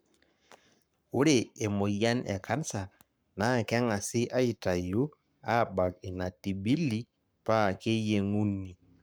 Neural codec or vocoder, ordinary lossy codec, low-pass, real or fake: codec, 44.1 kHz, 7.8 kbps, Pupu-Codec; none; none; fake